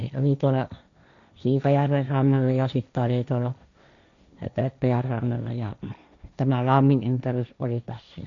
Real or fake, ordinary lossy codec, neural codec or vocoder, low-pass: fake; none; codec, 16 kHz, 1.1 kbps, Voila-Tokenizer; 7.2 kHz